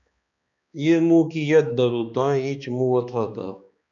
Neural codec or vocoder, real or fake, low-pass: codec, 16 kHz, 2 kbps, X-Codec, HuBERT features, trained on balanced general audio; fake; 7.2 kHz